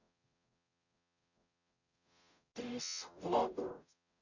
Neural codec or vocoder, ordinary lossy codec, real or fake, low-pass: codec, 44.1 kHz, 0.9 kbps, DAC; none; fake; 7.2 kHz